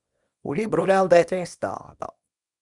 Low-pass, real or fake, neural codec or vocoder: 10.8 kHz; fake; codec, 24 kHz, 0.9 kbps, WavTokenizer, small release